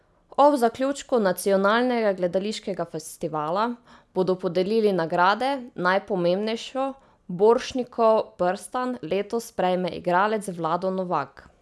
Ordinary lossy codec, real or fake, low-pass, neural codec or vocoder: none; real; none; none